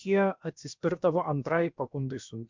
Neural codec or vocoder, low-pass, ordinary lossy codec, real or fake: codec, 16 kHz, about 1 kbps, DyCAST, with the encoder's durations; 7.2 kHz; MP3, 64 kbps; fake